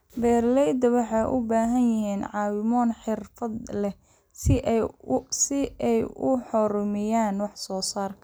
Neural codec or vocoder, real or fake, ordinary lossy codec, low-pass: none; real; none; none